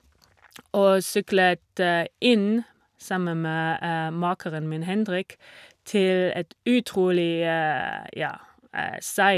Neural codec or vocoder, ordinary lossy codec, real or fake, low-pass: none; none; real; 14.4 kHz